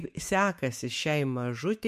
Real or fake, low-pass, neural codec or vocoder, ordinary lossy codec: fake; 14.4 kHz; autoencoder, 48 kHz, 128 numbers a frame, DAC-VAE, trained on Japanese speech; MP3, 64 kbps